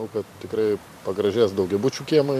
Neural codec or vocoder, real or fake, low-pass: vocoder, 44.1 kHz, 128 mel bands every 512 samples, BigVGAN v2; fake; 14.4 kHz